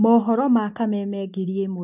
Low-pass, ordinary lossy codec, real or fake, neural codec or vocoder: 3.6 kHz; none; real; none